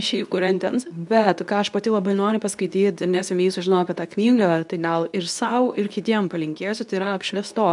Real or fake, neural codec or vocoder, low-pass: fake; codec, 24 kHz, 0.9 kbps, WavTokenizer, medium speech release version 2; 10.8 kHz